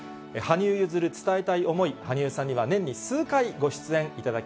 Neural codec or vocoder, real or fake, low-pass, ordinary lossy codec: none; real; none; none